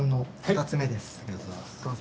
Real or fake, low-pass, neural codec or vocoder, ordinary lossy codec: real; none; none; none